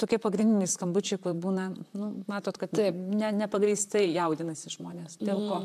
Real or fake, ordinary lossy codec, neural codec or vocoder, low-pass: fake; AAC, 96 kbps; vocoder, 44.1 kHz, 128 mel bands, Pupu-Vocoder; 14.4 kHz